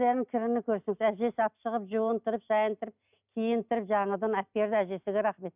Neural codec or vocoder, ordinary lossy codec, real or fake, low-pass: none; none; real; 3.6 kHz